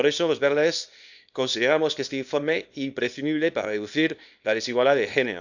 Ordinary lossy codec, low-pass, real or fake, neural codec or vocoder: none; 7.2 kHz; fake; codec, 24 kHz, 0.9 kbps, WavTokenizer, small release